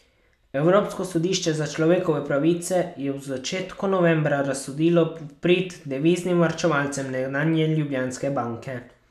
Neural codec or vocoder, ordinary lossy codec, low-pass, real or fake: none; none; 14.4 kHz; real